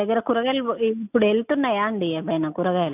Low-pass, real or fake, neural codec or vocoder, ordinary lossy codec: 3.6 kHz; real; none; none